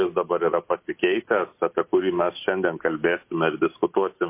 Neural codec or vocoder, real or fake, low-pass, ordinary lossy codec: none; real; 3.6 kHz; MP3, 24 kbps